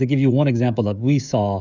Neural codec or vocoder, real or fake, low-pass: codec, 16 kHz, 16 kbps, FreqCodec, smaller model; fake; 7.2 kHz